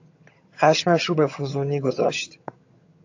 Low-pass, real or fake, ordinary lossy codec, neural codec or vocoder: 7.2 kHz; fake; AAC, 48 kbps; vocoder, 22.05 kHz, 80 mel bands, HiFi-GAN